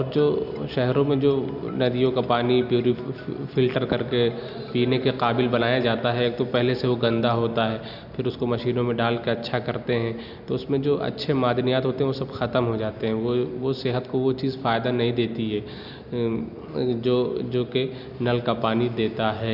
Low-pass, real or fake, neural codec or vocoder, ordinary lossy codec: 5.4 kHz; real; none; none